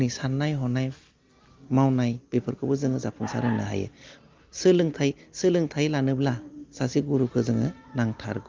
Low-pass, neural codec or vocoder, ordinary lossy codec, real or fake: 7.2 kHz; none; Opus, 32 kbps; real